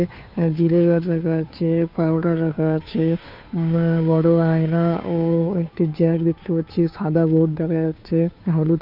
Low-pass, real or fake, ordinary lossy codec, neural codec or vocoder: 5.4 kHz; fake; none; codec, 16 kHz, 2 kbps, FunCodec, trained on Chinese and English, 25 frames a second